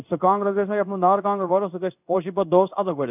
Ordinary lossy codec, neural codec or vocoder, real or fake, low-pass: none; codec, 16 kHz in and 24 kHz out, 1 kbps, XY-Tokenizer; fake; 3.6 kHz